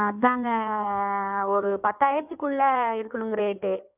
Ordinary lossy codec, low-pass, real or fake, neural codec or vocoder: none; 3.6 kHz; fake; codec, 16 kHz in and 24 kHz out, 1.1 kbps, FireRedTTS-2 codec